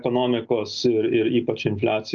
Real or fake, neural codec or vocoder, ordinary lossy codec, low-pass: real; none; Opus, 32 kbps; 7.2 kHz